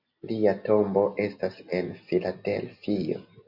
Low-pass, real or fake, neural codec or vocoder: 5.4 kHz; real; none